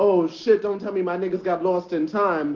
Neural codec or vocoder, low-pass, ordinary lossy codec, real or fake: none; 7.2 kHz; Opus, 32 kbps; real